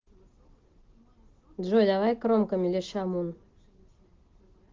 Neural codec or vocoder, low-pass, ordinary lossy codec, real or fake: none; 7.2 kHz; Opus, 16 kbps; real